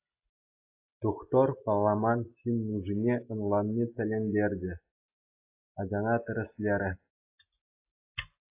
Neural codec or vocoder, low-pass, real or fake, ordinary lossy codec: none; 3.6 kHz; real; AAC, 32 kbps